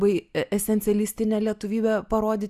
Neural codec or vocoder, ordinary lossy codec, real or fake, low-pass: none; Opus, 64 kbps; real; 14.4 kHz